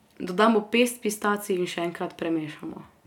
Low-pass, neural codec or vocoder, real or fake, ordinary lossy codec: 19.8 kHz; none; real; none